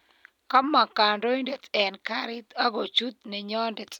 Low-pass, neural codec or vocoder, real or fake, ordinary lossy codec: 19.8 kHz; none; real; none